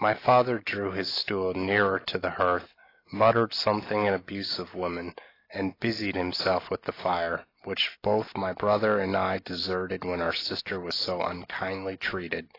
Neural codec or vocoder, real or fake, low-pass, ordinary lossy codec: none; real; 5.4 kHz; AAC, 24 kbps